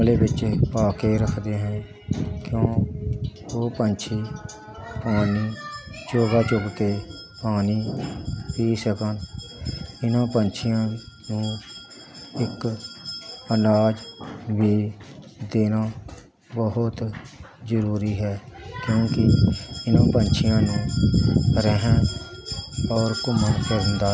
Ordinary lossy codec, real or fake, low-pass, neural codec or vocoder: none; real; none; none